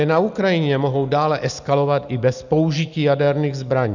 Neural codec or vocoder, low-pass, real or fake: none; 7.2 kHz; real